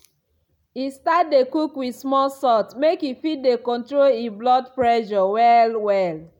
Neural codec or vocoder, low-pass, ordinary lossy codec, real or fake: none; 19.8 kHz; none; real